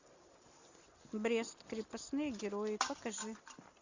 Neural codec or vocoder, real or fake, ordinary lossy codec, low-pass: none; real; Opus, 64 kbps; 7.2 kHz